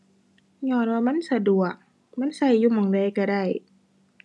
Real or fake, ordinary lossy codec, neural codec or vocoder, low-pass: real; none; none; none